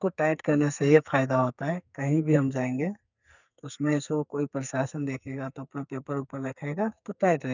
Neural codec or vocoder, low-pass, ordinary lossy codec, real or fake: codec, 44.1 kHz, 2.6 kbps, SNAC; 7.2 kHz; none; fake